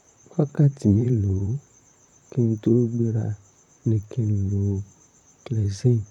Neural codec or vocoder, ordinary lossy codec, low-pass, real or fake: vocoder, 44.1 kHz, 128 mel bands, Pupu-Vocoder; MP3, 96 kbps; 19.8 kHz; fake